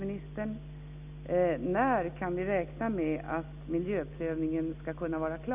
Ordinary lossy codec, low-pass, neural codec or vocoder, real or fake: none; 3.6 kHz; none; real